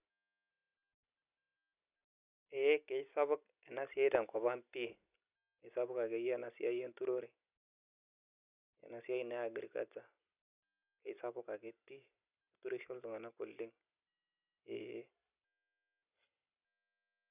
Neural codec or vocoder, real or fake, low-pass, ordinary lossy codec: none; real; 3.6 kHz; none